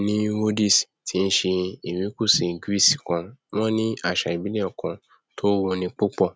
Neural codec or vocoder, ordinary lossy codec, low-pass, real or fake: none; none; none; real